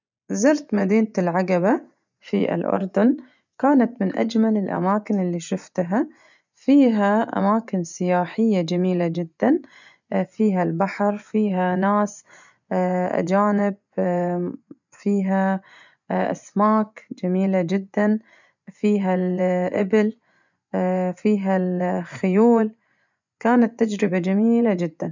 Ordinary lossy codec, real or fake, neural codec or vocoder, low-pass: none; fake; vocoder, 24 kHz, 100 mel bands, Vocos; 7.2 kHz